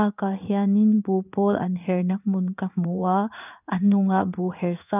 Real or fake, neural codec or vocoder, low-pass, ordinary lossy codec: fake; codec, 16 kHz in and 24 kHz out, 1 kbps, XY-Tokenizer; 3.6 kHz; none